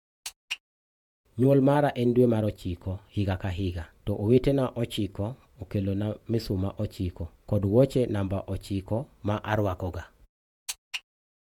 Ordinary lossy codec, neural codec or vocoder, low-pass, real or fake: MP3, 96 kbps; vocoder, 48 kHz, 128 mel bands, Vocos; 19.8 kHz; fake